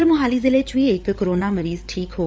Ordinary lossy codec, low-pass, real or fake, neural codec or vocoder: none; none; fake; codec, 16 kHz, 8 kbps, FreqCodec, larger model